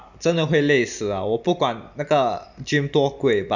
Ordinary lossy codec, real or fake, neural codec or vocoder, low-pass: none; real; none; 7.2 kHz